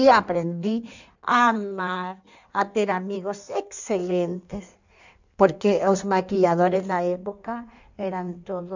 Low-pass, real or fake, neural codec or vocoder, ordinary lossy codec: 7.2 kHz; fake; codec, 16 kHz in and 24 kHz out, 1.1 kbps, FireRedTTS-2 codec; none